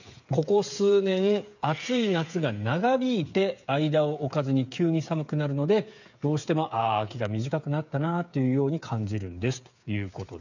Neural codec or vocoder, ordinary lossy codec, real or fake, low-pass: codec, 16 kHz, 8 kbps, FreqCodec, smaller model; none; fake; 7.2 kHz